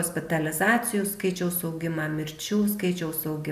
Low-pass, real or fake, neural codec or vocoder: 14.4 kHz; real; none